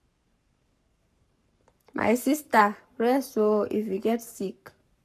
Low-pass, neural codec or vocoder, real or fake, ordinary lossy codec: 14.4 kHz; codec, 44.1 kHz, 7.8 kbps, Pupu-Codec; fake; none